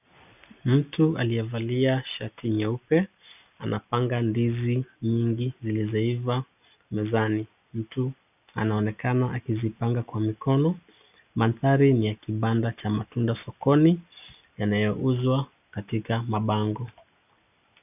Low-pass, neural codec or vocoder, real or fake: 3.6 kHz; none; real